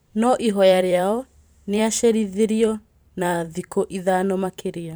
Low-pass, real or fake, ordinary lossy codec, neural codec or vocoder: none; fake; none; vocoder, 44.1 kHz, 128 mel bands every 512 samples, BigVGAN v2